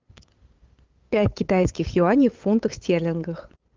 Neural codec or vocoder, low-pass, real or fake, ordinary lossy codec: codec, 16 kHz, 8 kbps, FunCodec, trained on LibriTTS, 25 frames a second; 7.2 kHz; fake; Opus, 24 kbps